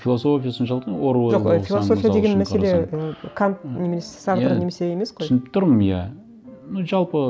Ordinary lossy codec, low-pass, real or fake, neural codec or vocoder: none; none; real; none